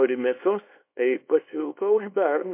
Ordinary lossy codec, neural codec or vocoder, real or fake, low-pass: MP3, 24 kbps; codec, 24 kHz, 0.9 kbps, WavTokenizer, small release; fake; 3.6 kHz